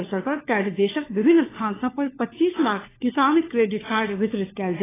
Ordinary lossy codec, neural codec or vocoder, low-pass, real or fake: AAC, 16 kbps; codec, 16 kHz, 4 kbps, FunCodec, trained on LibriTTS, 50 frames a second; 3.6 kHz; fake